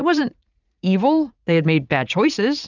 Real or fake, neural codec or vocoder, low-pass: fake; vocoder, 44.1 kHz, 80 mel bands, Vocos; 7.2 kHz